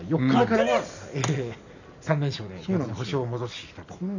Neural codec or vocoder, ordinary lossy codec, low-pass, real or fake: none; none; 7.2 kHz; real